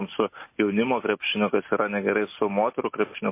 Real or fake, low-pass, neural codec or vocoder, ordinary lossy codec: real; 3.6 kHz; none; MP3, 24 kbps